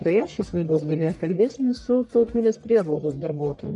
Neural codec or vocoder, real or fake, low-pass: codec, 44.1 kHz, 1.7 kbps, Pupu-Codec; fake; 10.8 kHz